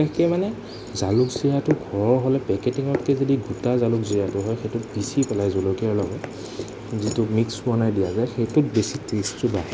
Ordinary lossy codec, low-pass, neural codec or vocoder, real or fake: none; none; none; real